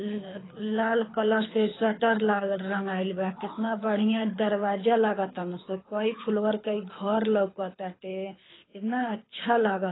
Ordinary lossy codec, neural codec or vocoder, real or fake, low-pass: AAC, 16 kbps; codec, 24 kHz, 6 kbps, HILCodec; fake; 7.2 kHz